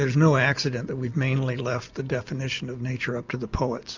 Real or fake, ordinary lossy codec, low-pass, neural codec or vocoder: real; MP3, 64 kbps; 7.2 kHz; none